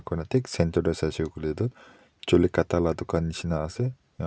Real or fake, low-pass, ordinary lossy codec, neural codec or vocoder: real; none; none; none